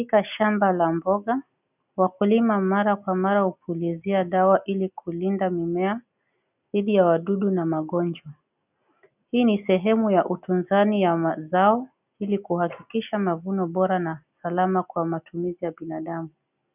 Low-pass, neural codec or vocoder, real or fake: 3.6 kHz; none; real